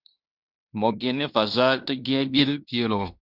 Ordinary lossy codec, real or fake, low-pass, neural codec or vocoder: Opus, 64 kbps; fake; 5.4 kHz; codec, 16 kHz in and 24 kHz out, 0.9 kbps, LongCat-Audio-Codec, fine tuned four codebook decoder